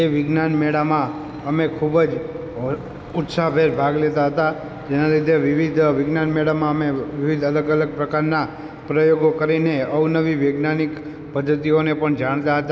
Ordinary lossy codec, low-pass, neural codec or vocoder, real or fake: none; none; none; real